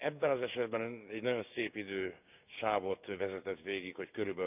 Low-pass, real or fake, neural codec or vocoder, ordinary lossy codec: 3.6 kHz; fake; codec, 16 kHz, 6 kbps, DAC; none